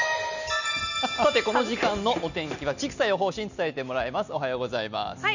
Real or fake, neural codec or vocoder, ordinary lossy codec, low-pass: real; none; none; 7.2 kHz